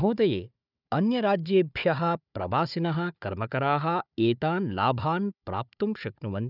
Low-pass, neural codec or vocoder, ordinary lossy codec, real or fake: 5.4 kHz; codec, 16 kHz, 4 kbps, FunCodec, trained on Chinese and English, 50 frames a second; none; fake